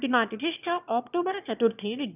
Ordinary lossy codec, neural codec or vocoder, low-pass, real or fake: none; autoencoder, 22.05 kHz, a latent of 192 numbers a frame, VITS, trained on one speaker; 3.6 kHz; fake